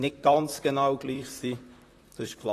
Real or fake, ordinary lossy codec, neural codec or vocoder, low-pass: fake; AAC, 48 kbps; vocoder, 44.1 kHz, 128 mel bands, Pupu-Vocoder; 14.4 kHz